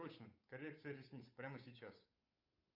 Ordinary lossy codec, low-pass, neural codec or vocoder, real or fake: AAC, 32 kbps; 5.4 kHz; codec, 16 kHz, 8 kbps, FunCodec, trained on Chinese and English, 25 frames a second; fake